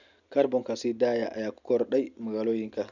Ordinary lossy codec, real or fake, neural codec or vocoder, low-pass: none; real; none; 7.2 kHz